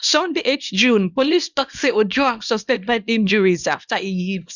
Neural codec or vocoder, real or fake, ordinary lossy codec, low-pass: codec, 24 kHz, 0.9 kbps, WavTokenizer, small release; fake; none; 7.2 kHz